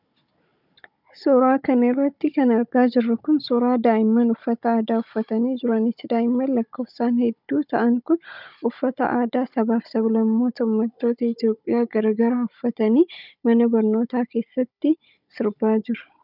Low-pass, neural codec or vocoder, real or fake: 5.4 kHz; codec, 16 kHz, 16 kbps, FunCodec, trained on Chinese and English, 50 frames a second; fake